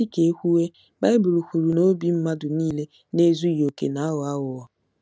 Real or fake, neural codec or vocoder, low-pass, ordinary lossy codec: real; none; none; none